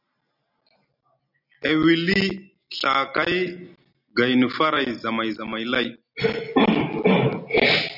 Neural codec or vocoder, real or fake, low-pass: none; real; 5.4 kHz